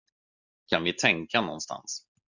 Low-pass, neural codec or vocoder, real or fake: 7.2 kHz; none; real